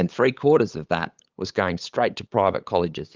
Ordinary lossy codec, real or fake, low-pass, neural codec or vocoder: Opus, 32 kbps; fake; 7.2 kHz; codec, 16 kHz, 8 kbps, FunCodec, trained on LibriTTS, 25 frames a second